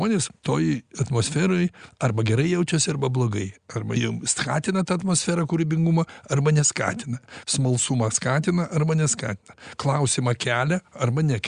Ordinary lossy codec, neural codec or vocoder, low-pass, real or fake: Opus, 64 kbps; none; 9.9 kHz; real